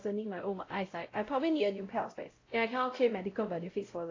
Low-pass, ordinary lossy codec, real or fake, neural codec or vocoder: 7.2 kHz; AAC, 32 kbps; fake; codec, 16 kHz, 0.5 kbps, X-Codec, WavLM features, trained on Multilingual LibriSpeech